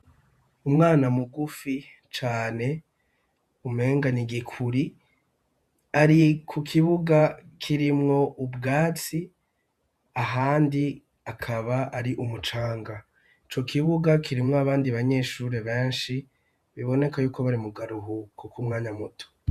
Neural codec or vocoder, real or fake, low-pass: vocoder, 48 kHz, 128 mel bands, Vocos; fake; 14.4 kHz